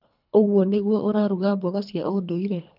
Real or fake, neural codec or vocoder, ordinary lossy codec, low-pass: fake; codec, 24 kHz, 3 kbps, HILCodec; none; 5.4 kHz